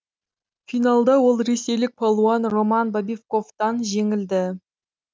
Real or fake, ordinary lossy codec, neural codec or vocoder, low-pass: real; none; none; none